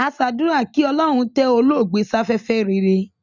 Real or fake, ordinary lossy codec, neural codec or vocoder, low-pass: real; none; none; 7.2 kHz